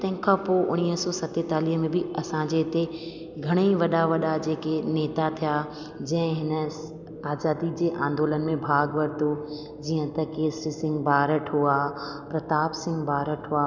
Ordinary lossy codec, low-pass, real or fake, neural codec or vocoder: none; 7.2 kHz; real; none